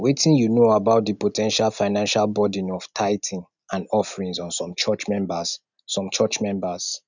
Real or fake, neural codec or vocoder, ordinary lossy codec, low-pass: real; none; none; 7.2 kHz